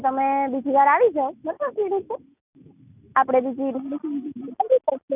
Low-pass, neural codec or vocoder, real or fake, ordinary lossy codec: 3.6 kHz; none; real; none